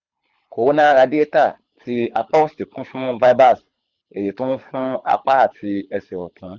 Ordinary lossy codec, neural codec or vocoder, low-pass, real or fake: Opus, 64 kbps; codec, 24 kHz, 6 kbps, HILCodec; 7.2 kHz; fake